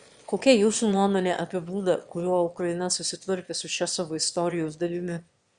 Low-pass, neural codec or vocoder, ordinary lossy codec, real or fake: 9.9 kHz; autoencoder, 22.05 kHz, a latent of 192 numbers a frame, VITS, trained on one speaker; Opus, 64 kbps; fake